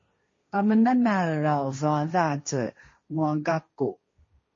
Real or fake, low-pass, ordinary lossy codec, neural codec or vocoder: fake; 7.2 kHz; MP3, 32 kbps; codec, 16 kHz, 1.1 kbps, Voila-Tokenizer